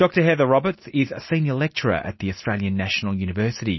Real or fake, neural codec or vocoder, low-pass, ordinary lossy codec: real; none; 7.2 kHz; MP3, 24 kbps